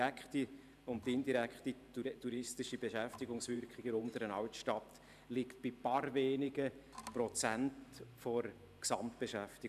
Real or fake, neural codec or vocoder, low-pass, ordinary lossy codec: fake; vocoder, 44.1 kHz, 128 mel bands every 512 samples, BigVGAN v2; 14.4 kHz; none